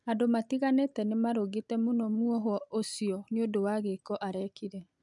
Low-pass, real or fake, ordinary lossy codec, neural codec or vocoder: 10.8 kHz; real; none; none